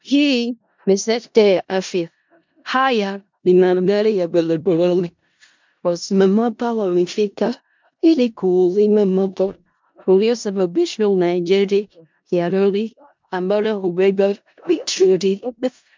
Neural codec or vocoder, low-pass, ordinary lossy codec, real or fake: codec, 16 kHz in and 24 kHz out, 0.4 kbps, LongCat-Audio-Codec, four codebook decoder; 7.2 kHz; MP3, 64 kbps; fake